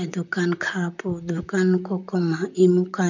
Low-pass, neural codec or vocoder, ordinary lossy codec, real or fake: 7.2 kHz; vocoder, 44.1 kHz, 128 mel bands, Pupu-Vocoder; none; fake